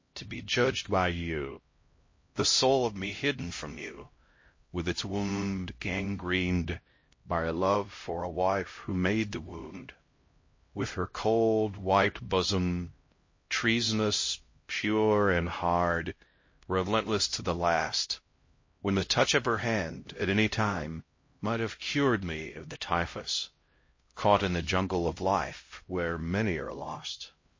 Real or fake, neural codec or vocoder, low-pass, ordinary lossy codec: fake; codec, 16 kHz, 0.5 kbps, X-Codec, HuBERT features, trained on LibriSpeech; 7.2 kHz; MP3, 32 kbps